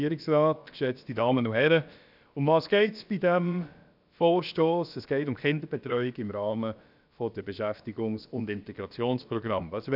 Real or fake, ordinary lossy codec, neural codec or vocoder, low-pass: fake; none; codec, 16 kHz, about 1 kbps, DyCAST, with the encoder's durations; 5.4 kHz